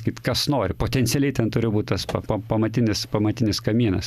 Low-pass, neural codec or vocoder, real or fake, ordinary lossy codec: 14.4 kHz; none; real; Opus, 64 kbps